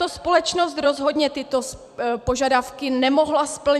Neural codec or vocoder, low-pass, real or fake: vocoder, 44.1 kHz, 128 mel bands every 256 samples, BigVGAN v2; 14.4 kHz; fake